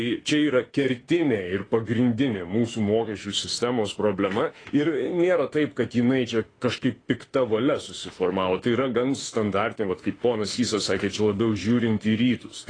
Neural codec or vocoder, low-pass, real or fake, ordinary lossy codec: autoencoder, 48 kHz, 32 numbers a frame, DAC-VAE, trained on Japanese speech; 9.9 kHz; fake; AAC, 32 kbps